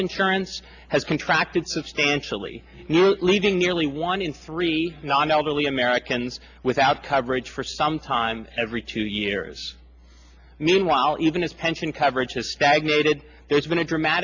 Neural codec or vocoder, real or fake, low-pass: none; real; 7.2 kHz